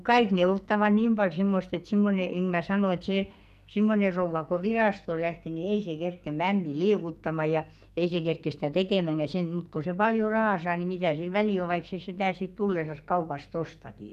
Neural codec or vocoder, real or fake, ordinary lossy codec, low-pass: codec, 44.1 kHz, 2.6 kbps, SNAC; fake; none; 14.4 kHz